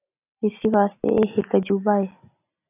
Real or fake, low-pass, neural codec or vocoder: real; 3.6 kHz; none